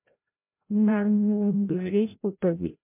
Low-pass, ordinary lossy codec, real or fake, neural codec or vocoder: 3.6 kHz; MP3, 32 kbps; fake; codec, 16 kHz, 0.5 kbps, FreqCodec, larger model